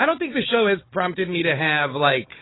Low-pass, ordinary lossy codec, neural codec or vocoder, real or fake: 7.2 kHz; AAC, 16 kbps; codec, 16 kHz, 4 kbps, FunCodec, trained on Chinese and English, 50 frames a second; fake